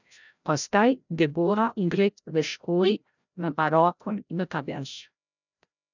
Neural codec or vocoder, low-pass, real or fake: codec, 16 kHz, 0.5 kbps, FreqCodec, larger model; 7.2 kHz; fake